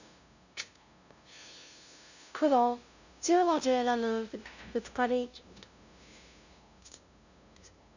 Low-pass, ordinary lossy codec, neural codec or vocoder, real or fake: 7.2 kHz; none; codec, 16 kHz, 0.5 kbps, FunCodec, trained on LibriTTS, 25 frames a second; fake